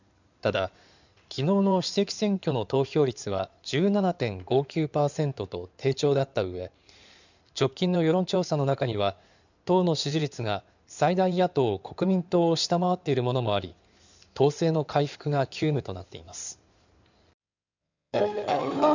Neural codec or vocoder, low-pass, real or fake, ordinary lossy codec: codec, 16 kHz in and 24 kHz out, 2.2 kbps, FireRedTTS-2 codec; 7.2 kHz; fake; none